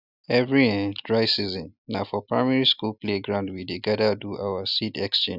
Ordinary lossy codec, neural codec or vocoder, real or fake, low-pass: none; none; real; 5.4 kHz